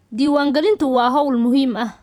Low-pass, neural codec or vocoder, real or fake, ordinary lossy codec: 19.8 kHz; vocoder, 44.1 kHz, 128 mel bands every 512 samples, BigVGAN v2; fake; none